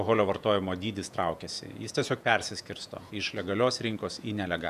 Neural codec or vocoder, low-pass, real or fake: vocoder, 48 kHz, 128 mel bands, Vocos; 14.4 kHz; fake